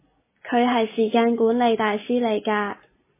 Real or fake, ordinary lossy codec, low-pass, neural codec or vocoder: real; MP3, 16 kbps; 3.6 kHz; none